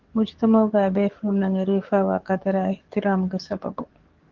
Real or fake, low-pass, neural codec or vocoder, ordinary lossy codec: fake; 7.2 kHz; codec, 44.1 kHz, 7.8 kbps, DAC; Opus, 16 kbps